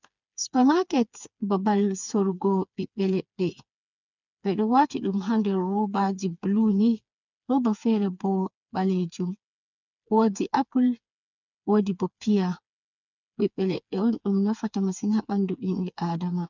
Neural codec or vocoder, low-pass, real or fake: codec, 16 kHz, 4 kbps, FreqCodec, smaller model; 7.2 kHz; fake